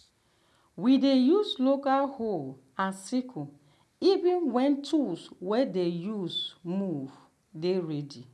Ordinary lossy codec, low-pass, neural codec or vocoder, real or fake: none; none; none; real